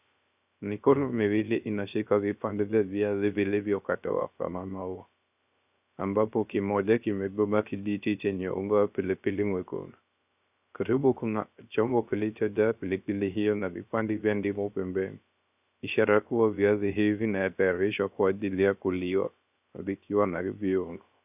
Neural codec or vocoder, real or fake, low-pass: codec, 16 kHz, 0.3 kbps, FocalCodec; fake; 3.6 kHz